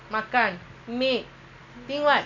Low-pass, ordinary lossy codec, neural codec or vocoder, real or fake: 7.2 kHz; none; none; real